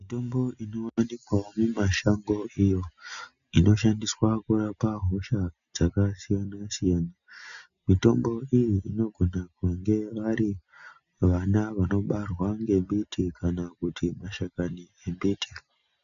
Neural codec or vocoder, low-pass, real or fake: none; 7.2 kHz; real